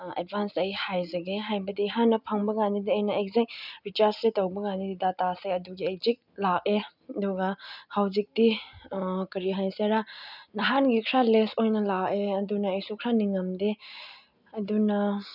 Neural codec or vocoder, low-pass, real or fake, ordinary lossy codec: none; 5.4 kHz; real; none